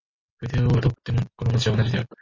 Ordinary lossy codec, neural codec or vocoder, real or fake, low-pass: MP3, 32 kbps; none; real; 7.2 kHz